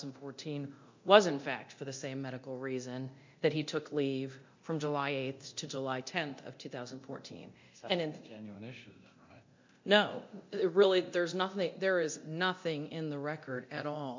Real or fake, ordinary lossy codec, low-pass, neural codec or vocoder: fake; MP3, 48 kbps; 7.2 kHz; codec, 24 kHz, 0.9 kbps, DualCodec